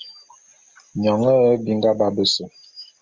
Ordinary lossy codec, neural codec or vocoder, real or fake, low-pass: Opus, 32 kbps; none; real; 7.2 kHz